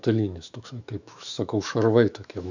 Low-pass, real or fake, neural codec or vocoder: 7.2 kHz; fake; autoencoder, 48 kHz, 128 numbers a frame, DAC-VAE, trained on Japanese speech